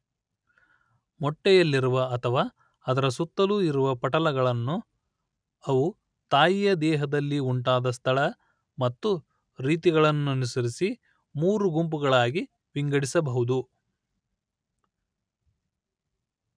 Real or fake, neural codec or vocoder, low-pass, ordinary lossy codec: real; none; 9.9 kHz; none